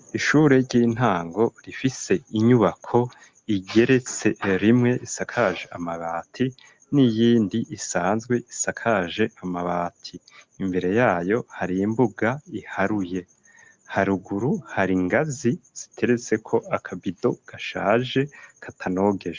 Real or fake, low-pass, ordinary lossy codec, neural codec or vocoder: real; 7.2 kHz; Opus, 32 kbps; none